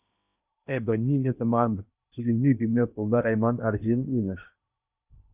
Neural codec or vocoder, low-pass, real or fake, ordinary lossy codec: codec, 16 kHz in and 24 kHz out, 0.8 kbps, FocalCodec, streaming, 65536 codes; 3.6 kHz; fake; Opus, 64 kbps